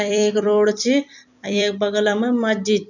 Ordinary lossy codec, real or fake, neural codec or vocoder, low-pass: none; fake; vocoder, 44.1 kHz, 128 mel bands every 256 samples, BigVGAN v2; 7.2 kHz